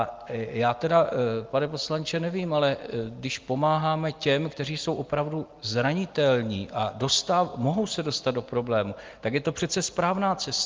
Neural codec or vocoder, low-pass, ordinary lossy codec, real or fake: none; 7.2 kHz; Opus, 32 kbps; real